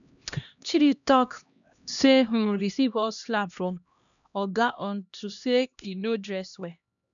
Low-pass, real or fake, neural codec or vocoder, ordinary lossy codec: 7.2 kHz; fake; codec, 16 kHz, 1 kbps, X-Codec, HuBERT features, trained on LibriSpeech; none